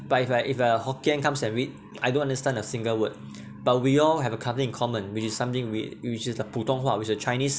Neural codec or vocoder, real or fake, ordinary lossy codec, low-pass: none; real; none; none